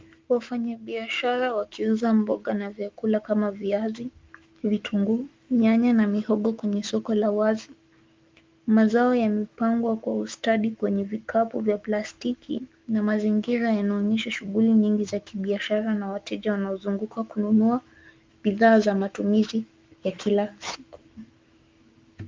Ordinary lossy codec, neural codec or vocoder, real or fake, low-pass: Opus, 32 kbps; autoencoder, 48 kHz, 128 numbers a frame, DAC-VAE, trained on Japanese speech; fake; 7.2 kHz